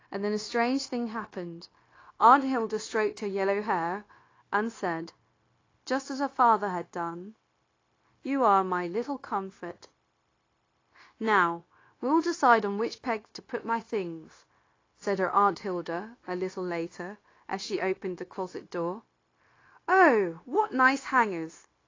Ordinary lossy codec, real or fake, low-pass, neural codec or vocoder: AAC, 32 kbps; fake; 7.2 kHz; codec, 16 kHz, 0.9 kbps, LongCat-Audio-Codec